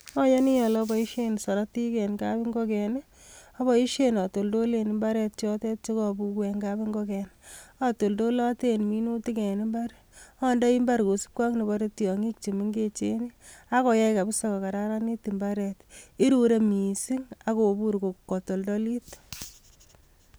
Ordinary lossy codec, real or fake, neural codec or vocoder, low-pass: none; real; none; none